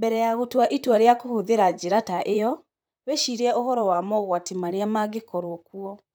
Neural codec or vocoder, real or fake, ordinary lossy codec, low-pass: vocoder, 44.1 kHz, 128 mel bands, Pupu-Vocoder; fake; none; none